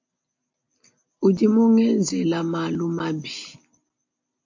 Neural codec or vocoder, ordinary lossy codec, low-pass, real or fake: none; MP3, 48 kbps; 7.2 kHz; real